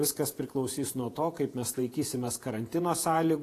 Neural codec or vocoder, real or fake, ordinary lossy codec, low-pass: vocoder, 48 kHz, 128 mel bands, Vocos; fake; AAC, 48 kbps; 14.4 kHz